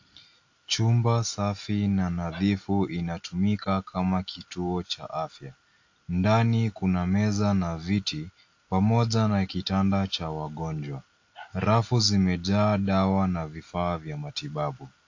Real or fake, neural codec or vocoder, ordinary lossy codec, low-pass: real; none; AAC, 48 kbps; 7.2 kHz